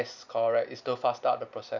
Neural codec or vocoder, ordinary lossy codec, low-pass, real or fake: none; none; 7.2 kHz; real